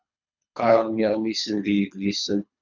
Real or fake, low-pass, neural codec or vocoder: fake; 7.2 kHz; codec, 24 kHz, 3 kbps, HILCodec